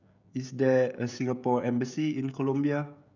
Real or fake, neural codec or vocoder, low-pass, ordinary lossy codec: fake; codec, 16 kHz, 16 kbps, FreqCodec, smaller model; 7.2 kHz; none